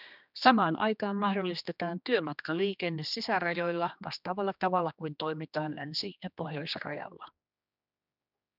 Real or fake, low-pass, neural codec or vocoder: fake; 5.4 kHz; codec, 16 kHz, 2 kbps, X-Codec, HuBERT features, trained on general audio